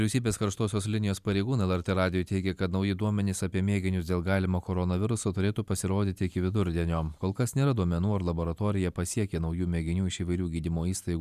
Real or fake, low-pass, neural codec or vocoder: fake; 14.4 kHz; vocoder, 44.1 kHz, 128 mel bands every 512 samples, BigVGAN v2